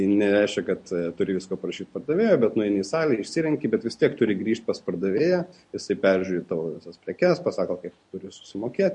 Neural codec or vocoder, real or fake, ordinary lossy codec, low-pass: none; real; MP3, 48 kbps; 10.8 kHz